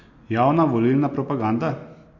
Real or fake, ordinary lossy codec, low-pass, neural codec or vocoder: real; MP3, 48 kbps; 7.2 kHz; none